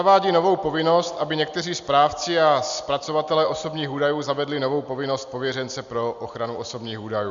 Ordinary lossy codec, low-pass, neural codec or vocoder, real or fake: Opus, 64 kbps; 7.2 kHz; none; real